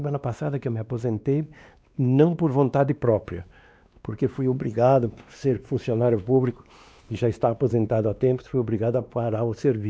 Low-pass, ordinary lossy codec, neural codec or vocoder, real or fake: none; none; codec, 16 kHz, 2 kbps, X-Codec, WavLM features, trained on Multilingual LibriSpeech; fake